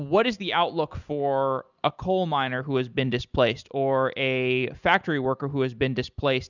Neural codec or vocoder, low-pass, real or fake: none; 7.2 kHz; real